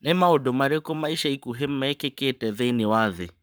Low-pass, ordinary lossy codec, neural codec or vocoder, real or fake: none; none; codec, 44.1 kHz, 7.8 kbps, Pupu-Codec; fake